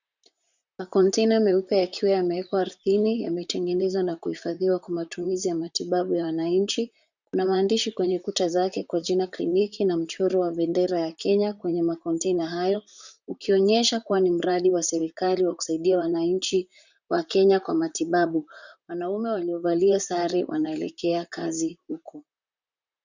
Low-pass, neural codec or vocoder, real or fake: 7.2 kHz; vocoder, 44.1 kHz, 128 mel bands, Pupu-Vocoder; fake